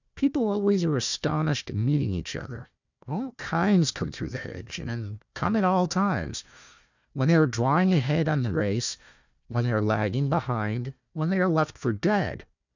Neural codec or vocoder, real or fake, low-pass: codec, 16 kHz, 1 kbps, FunCodec, trained on Chinese and English, 50 frames a second; fake; 7.2 kHz